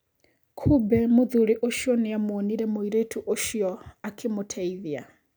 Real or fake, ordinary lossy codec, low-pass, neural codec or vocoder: real; none; none; none